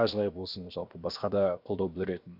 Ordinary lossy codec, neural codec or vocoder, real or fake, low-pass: Opus, 64 kbps; codec, 16 kHz, 0.7 kbps, FocalCodec; fake; 5.4 kHz